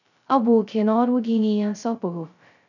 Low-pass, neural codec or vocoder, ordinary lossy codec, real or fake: 7.2 kHz; codec, 16 kHz, 0.2 kbps, FocalCodec; none; fake